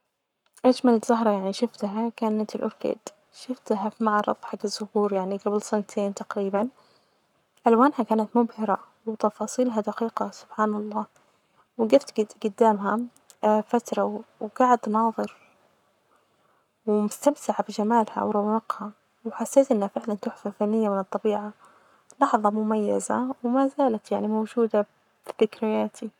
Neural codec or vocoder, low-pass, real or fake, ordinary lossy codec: codec, 44.1 kHz, 7.8 kbps, Pupu-Codec; 19.8 kHz; fake; none